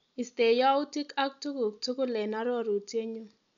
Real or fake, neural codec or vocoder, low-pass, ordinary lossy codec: real; none; 7.2 kHz; none